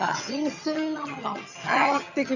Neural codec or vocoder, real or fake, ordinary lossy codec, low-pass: vocoder, 22.05 kHz, 80 mel bands, HiFi-GAN; fake; none; 7.2 kHz